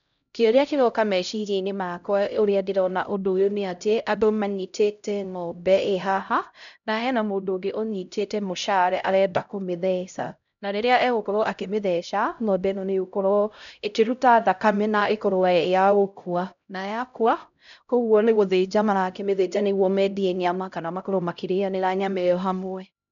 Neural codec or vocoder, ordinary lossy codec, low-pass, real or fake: codec, 16 kHz, 0.5 kbps, X-Codec, HuBERT features, trained on LibriSpeech; none; 7.2 kHz; fake